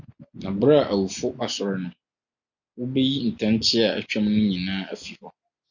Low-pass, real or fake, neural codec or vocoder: 7.2 kHz; real; none